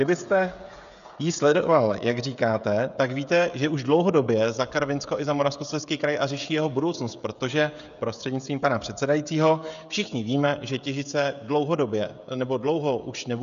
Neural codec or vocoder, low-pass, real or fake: codec, 16 kHz, 16 kbps, FreqCodec, smaller model; 7.2 kHz; fake